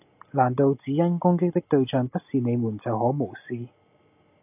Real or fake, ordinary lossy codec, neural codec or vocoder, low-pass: real; AAC, 32 kbps; none; 3.6 kHz